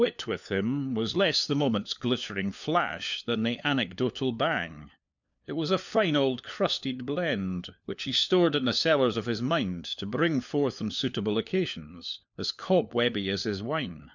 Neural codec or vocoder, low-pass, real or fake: codec, 16 kHz, 4 kbps, FunCodec, trained on LibriTTS, 50 frames a second; 7.2 kHz; fake